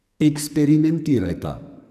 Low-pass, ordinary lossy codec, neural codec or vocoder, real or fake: 14.4 kHz; none; codec, 44.1 kHz, 2.6 kbps, SNAC; fake